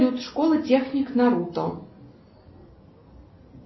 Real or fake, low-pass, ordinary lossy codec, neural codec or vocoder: real; 7.2 kHz; MP3, 24 kbps; none